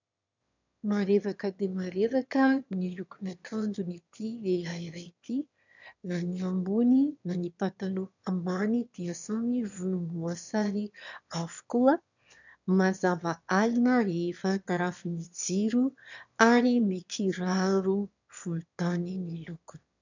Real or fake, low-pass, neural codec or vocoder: fake; 7.2 kHz; autoencoder, 22.05 kHz, a latent of 192 numbers a frame, VITS, trained on one speaker